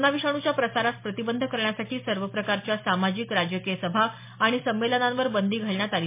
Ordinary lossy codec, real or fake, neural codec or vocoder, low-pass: MP3, 24 kbps; real; none; 3.6 kHz